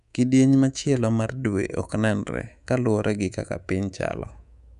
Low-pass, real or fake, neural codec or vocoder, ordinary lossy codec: 10.8 kHz; fake; codec, 24 kHz, 3.1 kbps, DualCodec; none